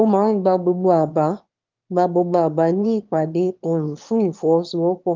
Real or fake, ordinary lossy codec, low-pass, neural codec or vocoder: fake; Opus, 32 kbps; 7.2 kHz; autoencoder, 22.05 kHz, a latent of 192 numbers a frame, VITS, trained on one speaker